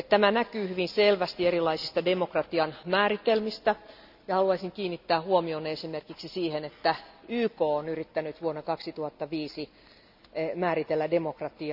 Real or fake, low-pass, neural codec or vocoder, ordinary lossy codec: real; 5.4 kHz; none; none